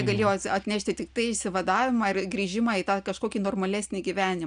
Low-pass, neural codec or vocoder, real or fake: 9.9 kHz; none; real